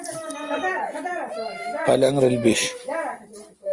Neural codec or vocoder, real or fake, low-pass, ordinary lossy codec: none; real; 10.8 kHz; Opus, 32 kbps